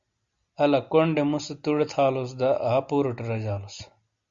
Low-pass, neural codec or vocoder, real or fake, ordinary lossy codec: 7.2 kHz; none; real; Opus, 64 kbps